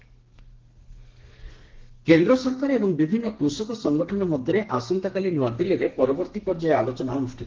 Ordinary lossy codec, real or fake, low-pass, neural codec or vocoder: Opus, 16 kbps; fake; 7.2 kHz; codec, 32 kHz, 1.9 kbps, SNAC